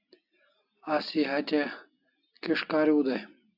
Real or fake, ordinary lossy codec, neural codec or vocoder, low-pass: real; AAC, 48 kbps; none; 5.4 kHz